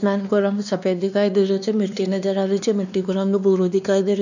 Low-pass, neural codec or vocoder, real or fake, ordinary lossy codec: 7.2 kHz; codec, 16 kHz, 2 kbps, X-Codec, WavLM features, trained on Multilingual LibriSpeech; fake; none